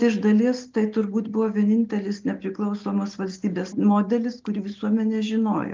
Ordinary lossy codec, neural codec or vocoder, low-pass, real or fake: Opus, 32 kbps; none; 7.2 kHz; real